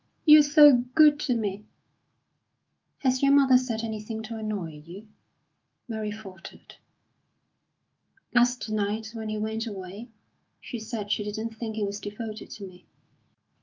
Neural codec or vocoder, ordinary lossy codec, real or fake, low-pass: autoencoder, 48 kHz, 128 numbers a frame, DAC-VAE, trained on Japanese speech; Opus, 24 kbps; fake; 7.2 kHz